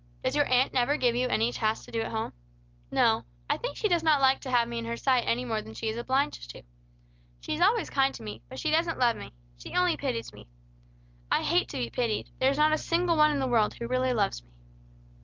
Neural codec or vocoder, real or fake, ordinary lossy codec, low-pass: none; real; Opus, 24 kbps; 7.2 kHz